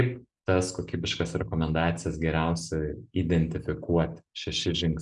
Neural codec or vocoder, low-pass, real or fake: none; 10.8 kHz; real